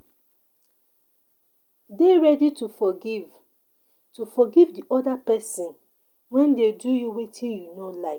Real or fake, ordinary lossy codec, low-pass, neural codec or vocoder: real; Opus, 32 kbps; 19.8 kHz; none